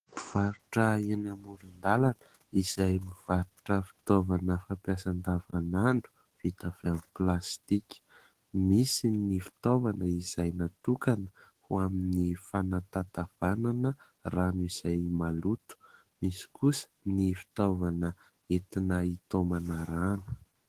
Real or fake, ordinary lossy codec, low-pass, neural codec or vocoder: fake; Opus, 16 kbps; 14.4 kHz; autoencoder, 48 kHz, 128 numbers a frame, DAC-VAE, trained on Japanese speech